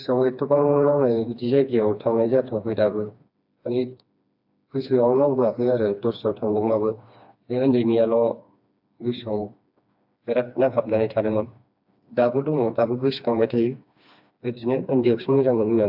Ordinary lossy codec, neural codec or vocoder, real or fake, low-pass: AAC, 48 kbps; codec, 16 kHz, 2 kbps, FreqCodec, smaller model; fake; 5.4 kHz